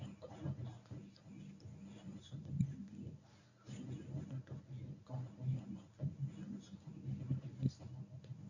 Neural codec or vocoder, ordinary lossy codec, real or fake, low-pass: codec, 24 kHz, 0.9 kbps, WavTokenizer, medium speech release version 1; none; fake; 7.2 kHz